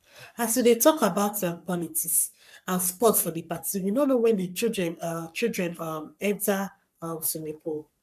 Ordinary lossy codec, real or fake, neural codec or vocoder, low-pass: none; fake; codec, 44.1 kHz, 3.4 kbps, Pupu-Codec; 14.4 kHz